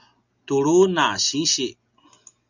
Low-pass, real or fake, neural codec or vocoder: 7.2 kHz; real; none